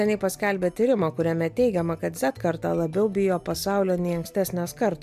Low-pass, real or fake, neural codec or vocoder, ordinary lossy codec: 14.4 kHz; real; none; MP3, 96 kbps